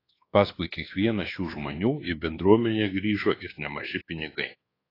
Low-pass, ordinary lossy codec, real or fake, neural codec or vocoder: 5.4 kHz; AAC, 24 kbps; fake; codec, 24 kHz, 1.2 kbps, DualCodec